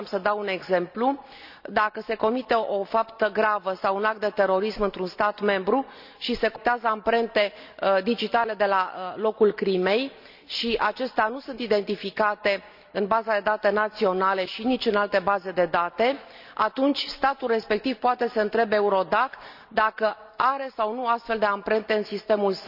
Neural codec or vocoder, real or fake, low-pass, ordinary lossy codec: none; real; 5.4 kHz; none